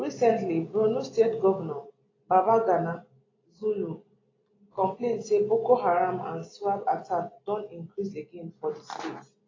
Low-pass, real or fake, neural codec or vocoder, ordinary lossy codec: 7.2 kHz; real; none; AAC, 32 kbps